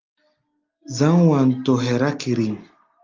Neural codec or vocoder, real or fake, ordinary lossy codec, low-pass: none; real; Opus, 24 kbps; 7.2 kHz